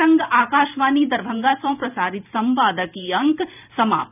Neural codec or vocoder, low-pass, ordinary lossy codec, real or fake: vocoder, 44.1 kHz, 128 mel bands every 512 samples, BigVGAN v2; 3.6 kHz; none; fake